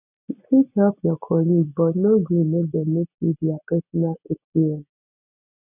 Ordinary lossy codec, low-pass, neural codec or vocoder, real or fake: none; 3.6 kHz; none; real